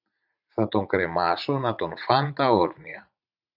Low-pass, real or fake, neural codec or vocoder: 5.4 kHz; fake; vocoder, 44.1 kHz, 80 mel bands, Vocos